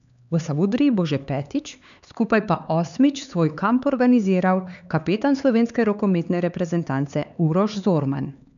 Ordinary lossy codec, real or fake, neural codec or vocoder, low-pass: none; fake; codec, 16 kHz, 4 kbps, X-Codec, HuBERT features, trained on LibriSpeech; 7.2 kHz